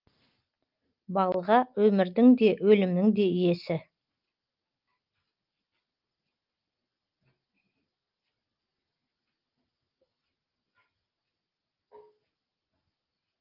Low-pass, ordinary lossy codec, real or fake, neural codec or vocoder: 5.4 kHz; Opus, 24 kbps; real; none